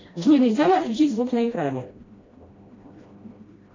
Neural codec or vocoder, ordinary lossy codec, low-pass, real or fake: codec, 16 kHz, 1 kbps, FreqCodec, smaller model; AAC, 32 kbps; 7.2 kHz; fake